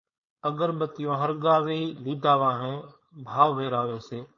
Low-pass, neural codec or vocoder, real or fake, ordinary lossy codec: 7.2 kHz; codec, 16 kHz, 4.8 kbps, FACodec; fake; MP3, 32 kbps